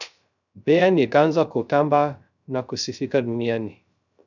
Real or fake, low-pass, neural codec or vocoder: fake; 7.2 kHz; codec, 16 kHz, 0.3 kbps, FocalCodec